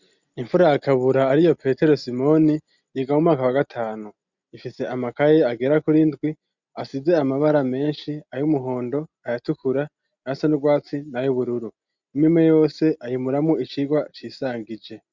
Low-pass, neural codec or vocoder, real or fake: 7.2 kHz; none; real